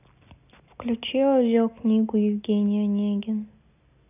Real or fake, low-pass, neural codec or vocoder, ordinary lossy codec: real; 3.6 kHz; none; none